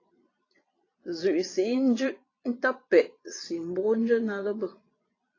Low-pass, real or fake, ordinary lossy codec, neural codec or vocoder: 7.2 kHz; fake; AAC, 32 kbps; vocoder, 22.05 kHz, 80 mel bands, Vocos